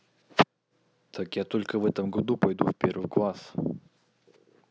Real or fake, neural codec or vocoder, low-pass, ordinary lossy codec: real; none; none; none